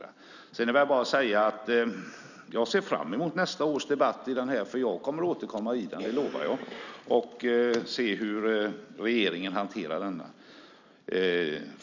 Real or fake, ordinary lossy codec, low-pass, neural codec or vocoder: real; none; 7.2 kHz; none